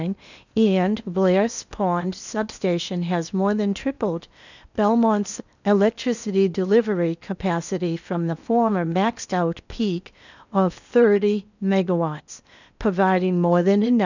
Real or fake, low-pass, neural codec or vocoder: fake; 7.2 kHz; codec, 16 kHz in and 24 kHz out, 0.8 kbps, FocalCodec, streaming, 65536 codes